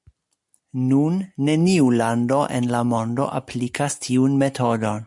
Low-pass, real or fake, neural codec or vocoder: 10.8 kHz; real; none